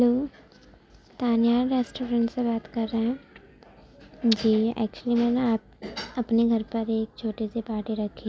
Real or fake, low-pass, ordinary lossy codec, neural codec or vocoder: real; none; none; none